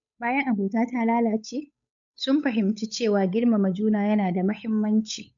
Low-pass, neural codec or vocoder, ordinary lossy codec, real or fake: 7.2 kHz; codec, 16 kHz, 8 kbps, FunCodec, trained on Chinese and English, 25 frames a second; MP3, 64 kbps; fake